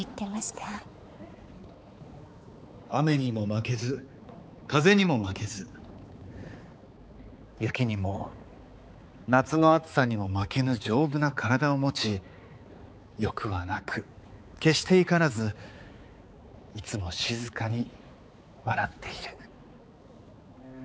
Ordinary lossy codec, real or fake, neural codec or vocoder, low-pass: none; fake; codec, 16 kHz, 4 kbps, X-Codec, HuBERT features, trained on balanced general audio; none